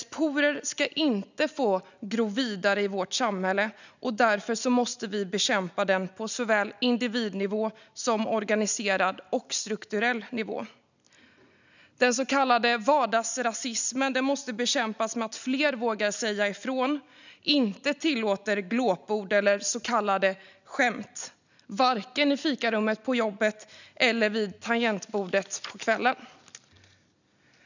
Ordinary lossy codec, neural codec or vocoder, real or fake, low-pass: none; none; real; 7.2 kHz